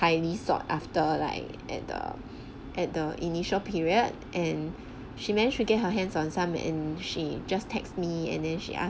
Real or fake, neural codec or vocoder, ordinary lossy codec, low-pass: real; none; none; none